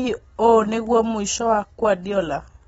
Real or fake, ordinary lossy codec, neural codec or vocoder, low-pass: fake; AAC, 24 kbps; vocoder, 44.1 kHz, 128 mel bands every 256 samples, BigVGAN v2; 19.8 kHz